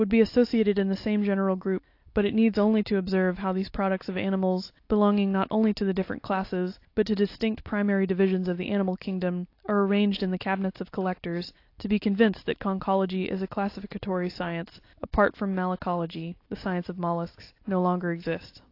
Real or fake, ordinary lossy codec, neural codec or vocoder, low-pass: real; AAC, 32 kbps; none; 5.4 kHz